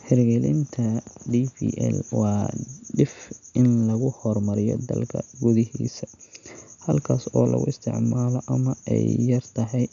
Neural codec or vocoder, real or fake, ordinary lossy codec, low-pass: none; real; none; 7.2 kHz